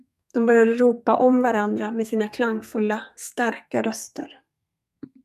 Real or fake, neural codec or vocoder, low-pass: fake; codec, 32 kHz, 1.9 kbps, SNAC; 14.4 kHz